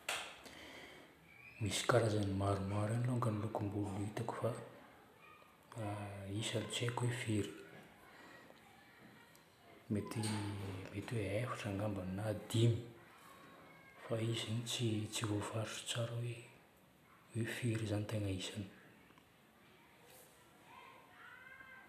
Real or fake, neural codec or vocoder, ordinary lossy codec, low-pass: real; none; none; 14.4 kHz